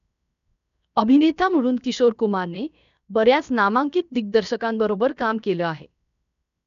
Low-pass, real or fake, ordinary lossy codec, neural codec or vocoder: 7.2 kHz; fake; none; codec, 16 kHz, 0.7 kbps, FocalCodec